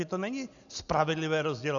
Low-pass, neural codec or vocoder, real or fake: 7.2 kHz; none; real